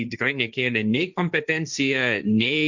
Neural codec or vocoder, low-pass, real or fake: codec, 16 kHz, 1.1 kbps, Voila-Tokenizer; 7.2 kHz; fake